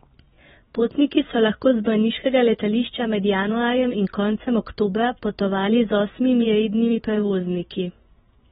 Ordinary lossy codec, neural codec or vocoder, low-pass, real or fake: AAC, 16 kbps; vocoder, 24 kHz, 100 mel bands, Vocos; 10.8 kHz; fake